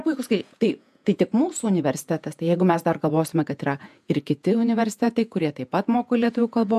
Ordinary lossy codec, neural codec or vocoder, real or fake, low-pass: MP3, 96 kbps; vocoder, 48 kHz, 128 mel bands, Vocos; fake; 14.4 kHz